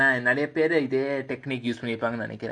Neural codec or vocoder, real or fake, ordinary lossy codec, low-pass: none; real; none; 9.9 kHz